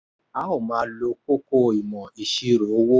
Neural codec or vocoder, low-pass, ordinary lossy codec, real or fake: none; none; none; real